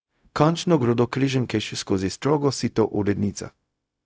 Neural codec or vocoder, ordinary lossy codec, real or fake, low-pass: codec, 16 kHz, 0.4 kbps, LongCat-Audio-Codec; none; fake; none